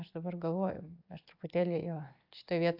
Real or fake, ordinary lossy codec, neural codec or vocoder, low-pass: real; AAC, 48 kbps; none; 5.4 kHz